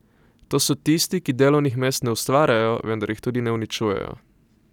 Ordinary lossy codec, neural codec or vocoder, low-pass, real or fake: none; none; 19.8 kHz; real